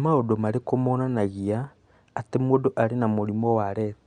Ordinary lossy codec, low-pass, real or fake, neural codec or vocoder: Opus, 64 kbps; 9.9 kHz; real; none